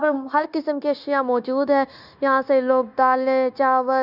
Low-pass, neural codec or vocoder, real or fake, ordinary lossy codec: 5.4 kHz; codec, 16 kHz, 0.9 kbps, LongCat-Audio-Codec; fake; none